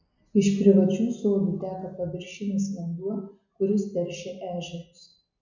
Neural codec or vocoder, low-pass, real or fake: none; 7.2 kHz; real